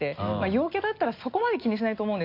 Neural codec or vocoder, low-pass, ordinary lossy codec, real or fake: none; 5.4 kHz; AAC, 32 kbps; real